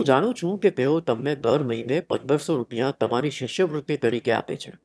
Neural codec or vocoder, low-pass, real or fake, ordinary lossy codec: autoencoder, 22.05 kHz, a latent of 192 numbers a frame, VITS, trained on one speaker; none; fake; none